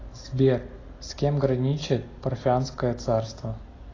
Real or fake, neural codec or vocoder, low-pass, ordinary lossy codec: real; none; 7.2 kHz; AAC, 32 kbps